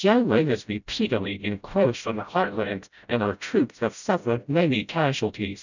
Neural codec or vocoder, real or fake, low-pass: codec, 16 kHz, 0.5 kbps, FreqCodec, smaller model; fake; 7.2 kHz